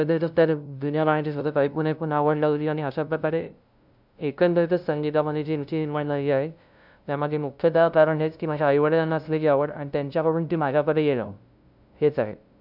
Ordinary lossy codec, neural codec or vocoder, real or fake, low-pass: none; codec, 16 kHz, 0.5 kbps, FunCodec, trained on LibriTTS, 25 frames a second; fake; 5.4 kHz